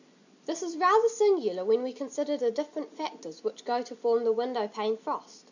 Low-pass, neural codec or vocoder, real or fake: 7.2 kHz; none; real